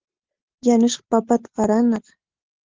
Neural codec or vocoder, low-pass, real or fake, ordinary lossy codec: none; 7.2 kHz; real; Opus, 16 kbps